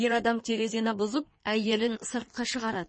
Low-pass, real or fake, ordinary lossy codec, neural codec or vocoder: 9.9 kHz; fake; MP3, 32 kbps; codec, 16 kHz in and 24 kHz out, 1.1 kbps, FireRedTTS-2 codec